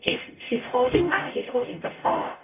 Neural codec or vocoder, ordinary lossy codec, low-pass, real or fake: codec, 44.1 kHz, 0.9 kbps, DAC; none; 3.6 kHz; fake